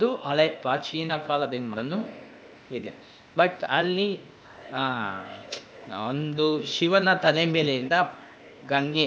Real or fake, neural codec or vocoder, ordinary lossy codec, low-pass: fake; codec, 16 kHz, 0.8 kbps, ZipCodec; none; none